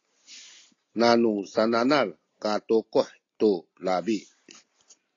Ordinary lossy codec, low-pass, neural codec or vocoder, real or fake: AAC, 32 kbps; 7.2 kHz; none; real